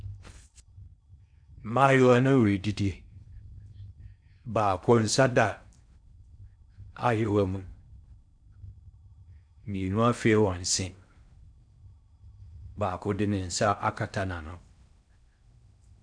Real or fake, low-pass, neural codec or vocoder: fake; 9.9 kHz; codec, 16 kHz in and 24 kHz out, 0.6 kbps, FocalCodec, streaming, 4096 codes